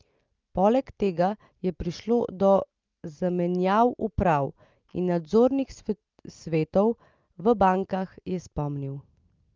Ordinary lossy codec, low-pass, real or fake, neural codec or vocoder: Opus, 24 kbps; 7.2 kHz; real; none